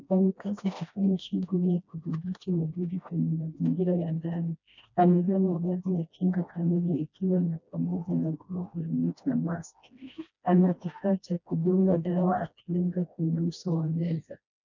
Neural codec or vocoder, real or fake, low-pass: codec, 16 kHz, 1 kbps, FreqCodec, smaller model; fake; 7.2 kHz